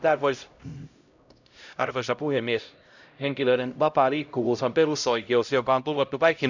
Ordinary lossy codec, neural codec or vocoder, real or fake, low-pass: none; codec, 16 kHz, 0.5 kbps, X-Codec, HuBERT features, trained on LibriSpeech; fake; 7.2 kHz